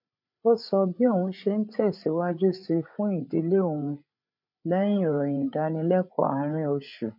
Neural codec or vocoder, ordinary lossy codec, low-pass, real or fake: codec, 16 kHz, 8 kbps, FreqCodec, larger model; none; 5.4 kHz; fake